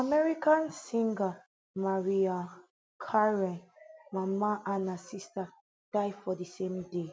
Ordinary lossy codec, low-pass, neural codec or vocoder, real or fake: none; none; none; real